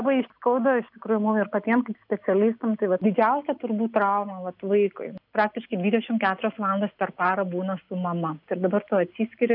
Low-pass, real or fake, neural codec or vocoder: 5.4 kHz; real; none